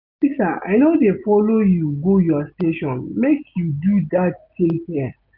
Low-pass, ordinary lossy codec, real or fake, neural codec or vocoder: 5.4 kHz; none; real; none